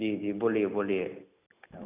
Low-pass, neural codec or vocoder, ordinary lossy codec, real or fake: 3.6 kHz; none; none; real